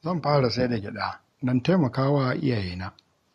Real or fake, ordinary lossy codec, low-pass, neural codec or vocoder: real; MP3, 64 kbps; 14.4 kHz; none